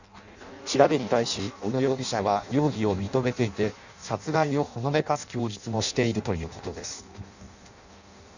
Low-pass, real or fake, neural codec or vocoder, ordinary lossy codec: 7.2 kHz; fake; codec, 16 kHz in and 24 kHz out, 0.6 kbps, FireRedTTS-2 codec; none